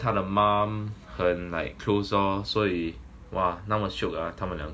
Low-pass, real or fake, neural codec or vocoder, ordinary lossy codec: none; real; none; none